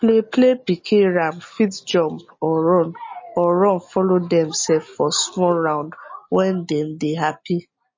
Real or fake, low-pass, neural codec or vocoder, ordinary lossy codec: real; 7.2 kHz; none; MP3, 32 kbps